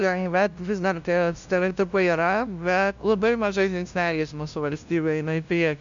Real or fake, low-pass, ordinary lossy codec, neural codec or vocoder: fake; 7.2 kHz; MP3, 64 kbps; codec, 16 kHz, 0.5 kbps, FunCodec, trained on LibriTTS, 25 frames a second